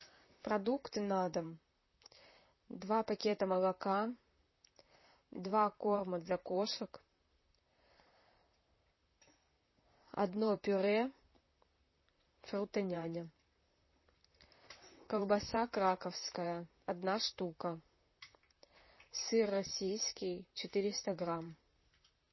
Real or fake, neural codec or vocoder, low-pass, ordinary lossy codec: fake; vocoder, 44.1 kHz, 128 mel bands, Pupu-Vocoder; 7.2 kHz; MP3, 24 kbps